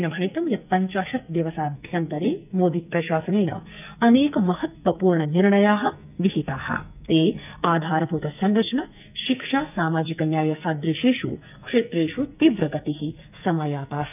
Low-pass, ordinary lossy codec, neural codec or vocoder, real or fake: 3.6 kHz; none; codec, 44.1 kHz, 2.6 kbps, SNAC; fake